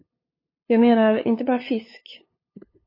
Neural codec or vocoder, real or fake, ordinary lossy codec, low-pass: codec, 16 kHz, 2 kbps, FunCodec, trained on LibriTTS, 25 frames a second; fake; MP3, 24 kbps; 5.4 kHz